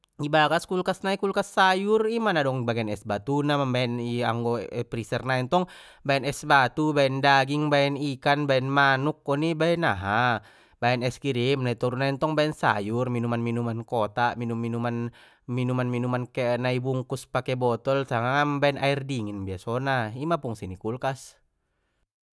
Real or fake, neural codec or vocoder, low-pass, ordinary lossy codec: real; none; none; none